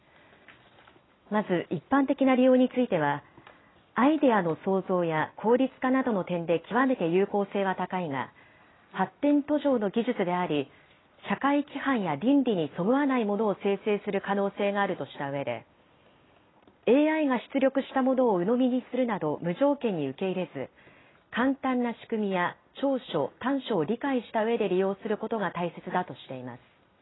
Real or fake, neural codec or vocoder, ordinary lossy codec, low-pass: real; none; AAC, 16 kbps; 7.2 kHz